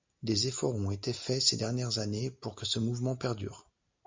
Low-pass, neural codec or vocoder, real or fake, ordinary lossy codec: 7.2 kHz; none; real; MP3, 64 kbps